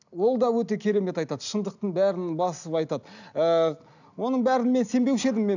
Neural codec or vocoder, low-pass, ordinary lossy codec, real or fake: none; 7.2 kHz; none; real